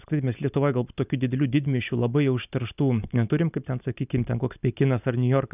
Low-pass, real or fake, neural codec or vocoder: 3.6 kHz; real; none